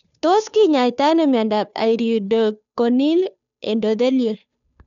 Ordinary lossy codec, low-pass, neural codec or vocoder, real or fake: none; 7.2 kHz; codec, 16 kHz, 2 kbps, FunCodec, trained on Chinese and English, 25 frames a second; fake